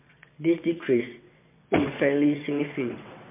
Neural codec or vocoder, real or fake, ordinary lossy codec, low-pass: vocoder, 22.05 kHz, 80 mel bands, WaveNeXt; fake; MP3, 24 kbps; 3.6 kHz